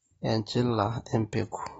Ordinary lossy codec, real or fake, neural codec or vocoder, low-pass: AAC, 24 kbps; real; none; 19.8 kHz